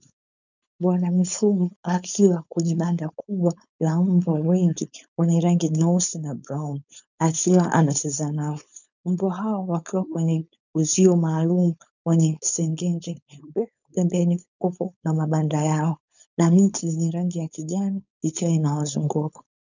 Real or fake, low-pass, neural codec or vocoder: fake; 7.2 kHz; codec, 16 kHz, 4.8 kbps, FACodec